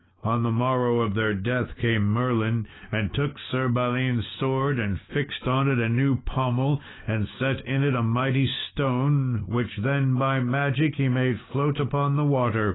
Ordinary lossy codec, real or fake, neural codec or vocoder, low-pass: AAC, 16 kbps; fake; codec, 16 kHz, 6 kbps, DAC; 7.2 kHz